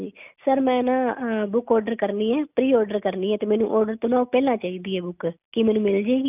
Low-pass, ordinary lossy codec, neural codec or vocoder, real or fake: 3.6 kHz; none; none; real